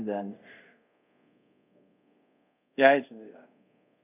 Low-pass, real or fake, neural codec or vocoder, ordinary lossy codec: 3.6 kHz; fake; codec, 24 kHz, 0.5 kbps, DualCodec; none